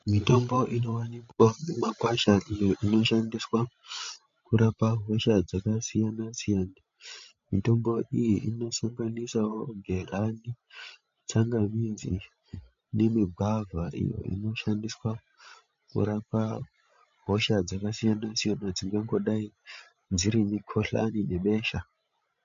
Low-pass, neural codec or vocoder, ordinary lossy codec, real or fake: 7.2 kHz; codec, 16 kHz, 16 kbps, FreqCodec, larger model; MP3, 48 kbps; fake